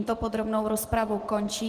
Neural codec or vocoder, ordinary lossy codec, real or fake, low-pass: none; Opus, 16 kbps; real; 14.4 kHz